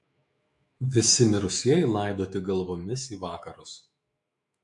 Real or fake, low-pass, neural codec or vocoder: fake; 10.8 kHz; autoencoder, 48 kHz, 128 numbers a frame, DAC-VAE, trained on Japanese speech